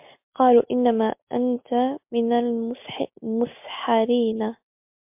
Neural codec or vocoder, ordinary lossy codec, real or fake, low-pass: none; MP3, 32 kbps; real; 3.6 kHz